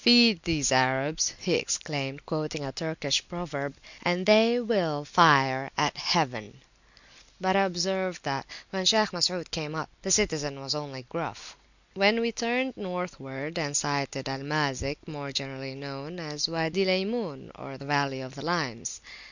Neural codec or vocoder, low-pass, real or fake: none; 7.2 kHz; real